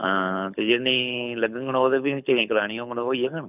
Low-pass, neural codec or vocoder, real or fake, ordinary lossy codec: 3.6 kHz; codec, 24 kHz, 6 kbps, HILCodec; fake; none